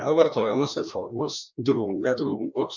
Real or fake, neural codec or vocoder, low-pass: fake; codec, 16 kHz, 1 kbps, FreqCodec, larger model; 7.2 kHz